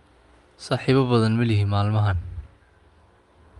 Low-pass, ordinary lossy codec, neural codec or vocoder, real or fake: 10.8 kHz; Opus, 32 kbps; none; real